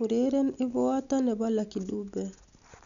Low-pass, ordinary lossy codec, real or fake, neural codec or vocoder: 7.2 kHz; none; real; none